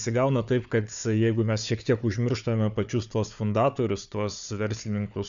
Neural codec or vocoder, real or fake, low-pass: codec, 16 kHz, 4 kbps, FunCodec, trained on Chinese and English, 50 frames a second; fake; 7.2 kHz